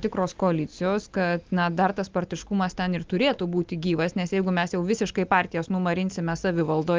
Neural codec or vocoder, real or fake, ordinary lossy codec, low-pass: none; real; Opus, 24 kbps; 7.2 kHz